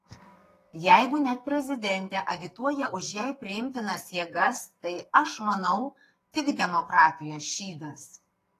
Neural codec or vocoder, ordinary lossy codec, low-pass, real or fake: codec, 44.1 kHz, 2.6 kbps, SNAC; AAC, 48 kbps; 14.4 kHz; fake